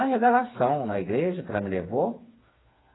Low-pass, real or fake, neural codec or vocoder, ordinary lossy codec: 7.2 kHz; fake; codec, 16 kHz, 4 kbps, FreqCodec, smaller model; AAC, 16 kbps